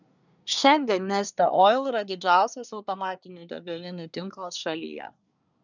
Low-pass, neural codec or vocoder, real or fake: 7.2 kHz; codec, 24 kHz, 1 kbps, SNAC; fake